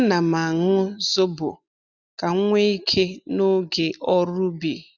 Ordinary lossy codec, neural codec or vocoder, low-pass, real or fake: none; none; 7.2 kHz; real